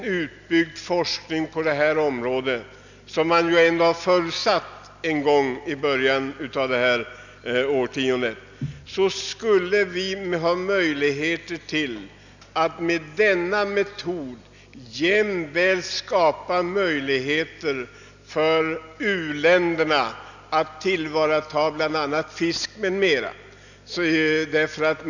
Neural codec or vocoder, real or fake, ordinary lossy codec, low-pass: none; real; none; 7.2 kHz